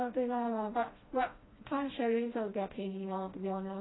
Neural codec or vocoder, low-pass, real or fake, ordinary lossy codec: codec, 16 kHz, 1 kbps, FreqCodec, smaller model; 7.2 kHz; fake; AAC, 16 kbps